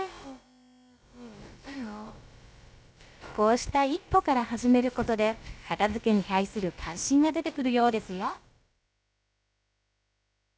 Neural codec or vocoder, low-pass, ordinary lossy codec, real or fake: codec, 16 kHz, about 1 kbps, DyCAST, with the encoder's durations; none; none; fake